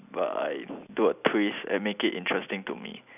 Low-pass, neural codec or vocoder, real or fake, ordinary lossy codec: 3.6 kHz; none; real; none